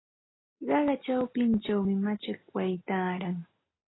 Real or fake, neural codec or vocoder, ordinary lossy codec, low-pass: real; none; AAC, 16 kbps; 7.2 kHz